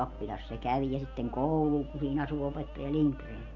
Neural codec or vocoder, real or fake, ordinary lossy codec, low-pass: none; real; none; 7.2 kHz